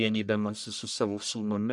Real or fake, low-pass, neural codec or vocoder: fake; 10.8 kHz; codec, 44.1 kHz, 1.7 kbps, Pupu-Codec